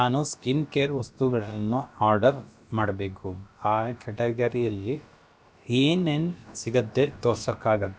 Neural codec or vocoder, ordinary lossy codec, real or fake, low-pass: codec, 16 kHz, about 1 kbps, DyCAST, with the encoder's durations; none; fake; none